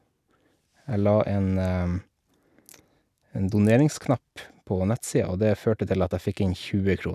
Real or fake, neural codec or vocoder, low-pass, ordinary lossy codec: real; none; 14.4 kHz; none